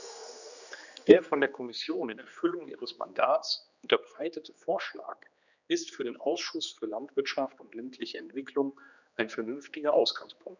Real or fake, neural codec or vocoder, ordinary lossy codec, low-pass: fake; codec, 16 kHz, 2 kbps, X-Codec, HuBERT features, trained on general audio; none; 7.2 kHz